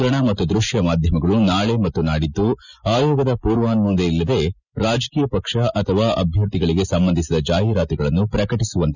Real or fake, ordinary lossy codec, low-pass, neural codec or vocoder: real; none; 7.2 kHz; none